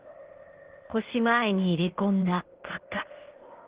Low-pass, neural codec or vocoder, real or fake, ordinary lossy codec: 3.6 kHz; codec, 16 kHz, 0.8 kbps, ZipCodec; fake; Opus, 16 kbps